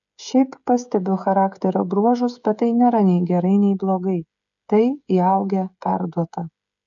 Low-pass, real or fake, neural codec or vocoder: 7.2 kHz; fake; codec, 16 kHz, 16 kbps, FreqCodec, smaller model